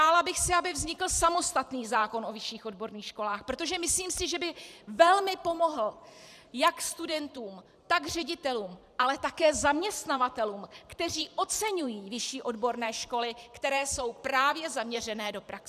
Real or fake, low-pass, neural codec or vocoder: fake; 14.4 kHz; vocoder, 44.1 kHz, 128 mel bands every 512 samples, BigVGAN v2